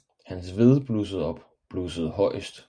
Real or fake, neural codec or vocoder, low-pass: real; none; 9.9 kHz